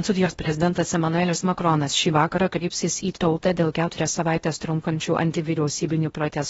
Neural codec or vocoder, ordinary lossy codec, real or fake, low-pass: codec, 16 kHz in and 24 kHz out, 0.6 kbps, FocalCodec, streaming, 4096 codes; AAC, 24 kbps; fake; 10.8 kHz